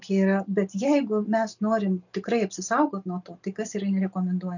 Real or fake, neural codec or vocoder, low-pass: real; none; 7.2 kHz